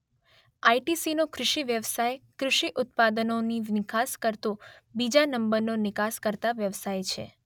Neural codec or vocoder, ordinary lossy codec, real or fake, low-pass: none; none; real; 19.8 kHz